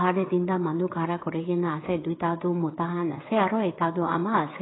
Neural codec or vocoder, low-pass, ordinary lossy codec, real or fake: codec, 16 kHz, 16 kbps, FreqCodec, smaller model; 7.2 kHz; AAC, 16 kbps; fake